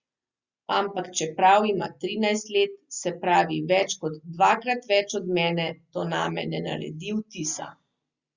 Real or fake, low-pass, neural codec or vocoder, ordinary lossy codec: real; 7.2 kHz; none; Opus, 64 kbps